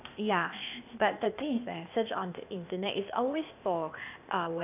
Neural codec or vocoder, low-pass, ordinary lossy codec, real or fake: codec, 16 kHz, 0.8 kbps, ZipCodec; 3.6 kHz; none; fake